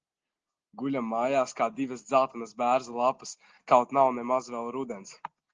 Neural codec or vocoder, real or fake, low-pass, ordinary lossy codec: none; real; 7.2 kHz; Opus, 16 kbps